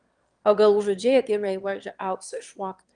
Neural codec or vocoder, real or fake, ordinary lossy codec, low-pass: autoencoder, 22.05 kHz, a latent of 192 numbers a frame, VITS, trained on one speaker; fake; Opus, 24 kbps; 9.9 kHz